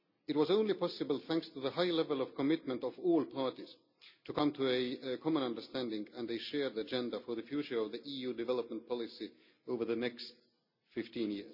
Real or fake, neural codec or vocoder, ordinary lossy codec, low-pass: real; none; none; 5.4 kHz